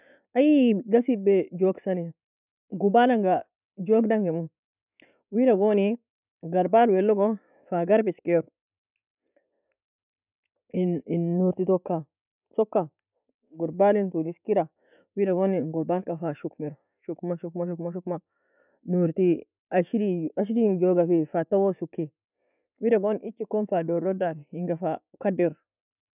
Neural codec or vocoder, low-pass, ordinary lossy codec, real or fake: none; 3.6 kHz; none; real